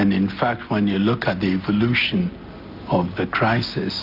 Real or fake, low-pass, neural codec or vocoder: real; 5.4 kHz; none